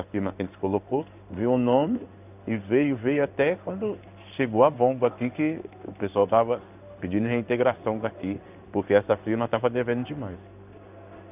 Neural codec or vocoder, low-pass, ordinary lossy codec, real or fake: codec, 16 kHz in and 24 kHz out, 1 kbps, XY-Tokenizer; 3.6 kHz; none; fake